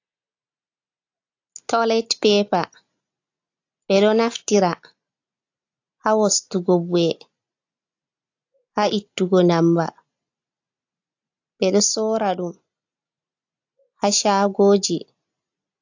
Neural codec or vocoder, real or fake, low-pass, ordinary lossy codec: none; real; 7.2 kHz; AAC, 48 kbps